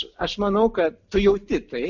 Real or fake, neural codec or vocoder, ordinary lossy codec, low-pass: real; none; MP3, 64 kbps; 7.2 kHz